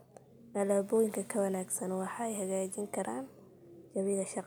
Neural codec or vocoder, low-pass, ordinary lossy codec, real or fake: none; none; none; real